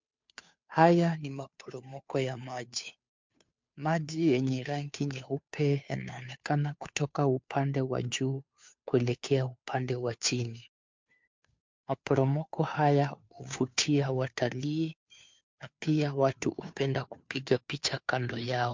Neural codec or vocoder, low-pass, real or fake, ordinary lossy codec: codec, 16 kHz, 2 kbps, FunCodec, trained on Chinese and English, 25 frames a second; 7.2 kHz; fake; MP3, 64 kbps